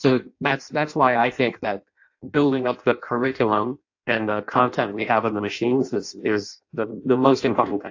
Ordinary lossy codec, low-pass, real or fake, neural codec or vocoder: AAC, 48 kbps; 7.2 kHz; fake; codec, 16 kHz in and 24 kHz out, 0.6 kbps, FireRedTTS-2 codec